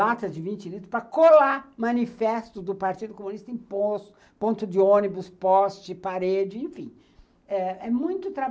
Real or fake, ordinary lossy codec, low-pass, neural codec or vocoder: real; none; none; none